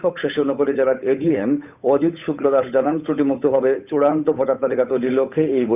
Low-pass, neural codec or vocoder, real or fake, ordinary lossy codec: 3.6 kHz; codec, 16 kHz, 8 kbps, FunCodec, trained on Chinese and English, 25 frames a second; fake; none